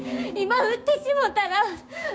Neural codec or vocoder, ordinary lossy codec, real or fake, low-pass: codec, 16 kHz, 6 kbps, DAC; none; fake; none